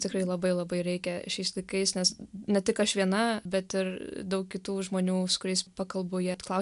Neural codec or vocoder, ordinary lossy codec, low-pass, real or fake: none; MP3, 96 kbps; 10.8 kHz; real